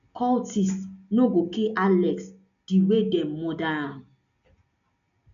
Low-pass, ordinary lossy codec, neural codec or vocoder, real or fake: 7.2 kHz; none; none; real